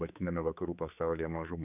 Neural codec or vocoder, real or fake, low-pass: codec, 16 kHz, 4 kbps, X-Codec, HuBERT features, trained on general audio; fake; 3.6 kHz